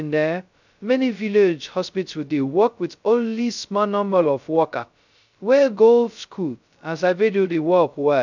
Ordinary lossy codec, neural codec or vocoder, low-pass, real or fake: none; codec, 16 kHz, 0.2 kbps, FocalCodec; 7.2 kHz; fake